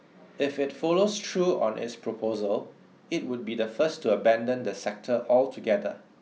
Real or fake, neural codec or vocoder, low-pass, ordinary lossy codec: real; none; none; none